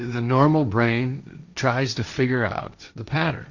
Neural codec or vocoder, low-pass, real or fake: codec, 16 kHz, 1.1 kbps, Voila-Tokenizer; 7.2 kHz; fake